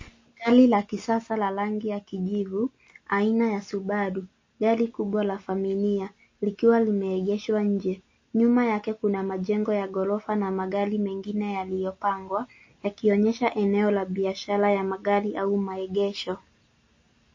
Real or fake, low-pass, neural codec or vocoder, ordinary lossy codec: real; 7.2 kHz; none; MP3, 32 kbps